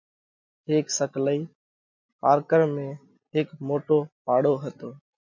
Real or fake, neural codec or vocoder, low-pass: real; none; 7.2 kHz